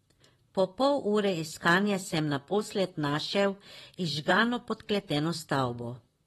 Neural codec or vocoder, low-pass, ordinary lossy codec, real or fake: vocoder, 44.1 kHz, 128 mel bands, Pupu-Vocoder; 19.8 kHz; AAC, 32 kbps; fake